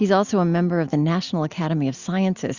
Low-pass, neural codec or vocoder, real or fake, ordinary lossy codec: 7.2 kHz; none; real; Opus, 64 kbps